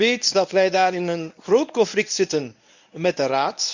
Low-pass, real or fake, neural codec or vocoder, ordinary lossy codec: 7.2 kHz; fake; codec, 24 kHz, 0.9 kbps, WavTokenizer, medium speech release version 1; none